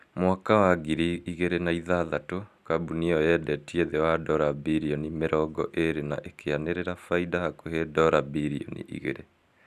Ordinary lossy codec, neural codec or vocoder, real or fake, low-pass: none; none; real; 14.4 kHz